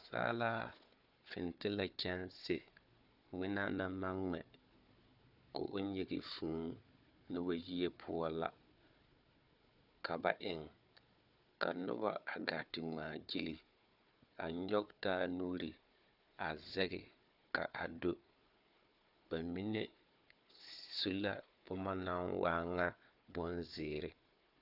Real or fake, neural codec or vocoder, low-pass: fake; codec, 16 kHz, 4 kbps, FunCodec, trained on Chinese and English, 50 frames a second; 5.4 kHz